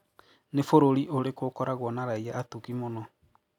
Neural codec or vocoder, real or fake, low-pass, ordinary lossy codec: none; real; 19.8 kHz; none